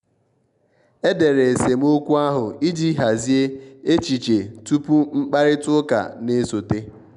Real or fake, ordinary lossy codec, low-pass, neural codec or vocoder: real; none; 10.8 kHz; none